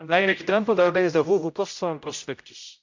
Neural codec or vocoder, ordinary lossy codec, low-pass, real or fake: codec, 16 kHz, 0.5 kbps, X-Codec, HuBERT features, trained on general audio; MP3, 64 kbps; 7.2 kHz; fake